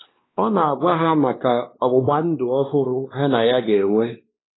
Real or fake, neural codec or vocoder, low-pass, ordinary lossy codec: fake; codec, 16 kHz, 2 kbps, X-Codec, WavLM features, trained on Multilingual LibriSpeech; 7.2 kHz; AAC, 16 kbps